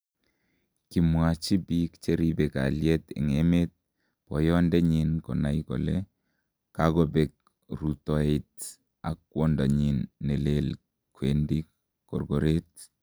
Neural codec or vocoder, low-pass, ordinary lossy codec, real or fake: none; none; none; real